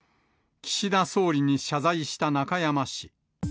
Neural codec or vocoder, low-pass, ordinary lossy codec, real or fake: none; none; none; real